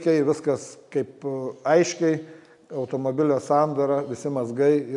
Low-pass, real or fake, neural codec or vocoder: 10.8 kHz; real; none